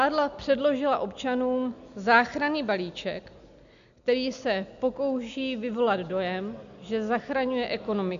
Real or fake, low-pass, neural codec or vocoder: real; 7.2 kHz; none